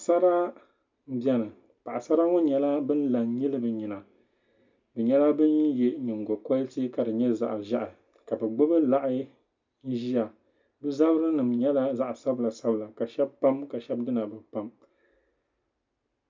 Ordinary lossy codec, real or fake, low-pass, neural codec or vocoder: MP3, 64 kbps; real; 7.2 kHz; none